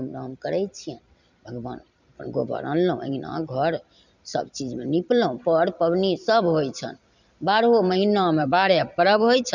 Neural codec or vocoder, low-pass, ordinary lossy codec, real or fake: vocoder, 44.1 kHz, 128 mel bands every 256 samples, BigVGAN v2; 7.2 kHz; none; fake